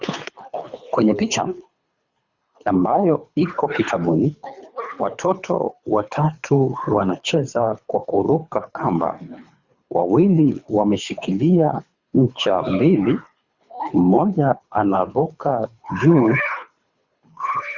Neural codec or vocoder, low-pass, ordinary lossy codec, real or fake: codec, 24 kHz, 3 kbps, HILCodec; 7.2 kHz; Opus, 64 kbps; fake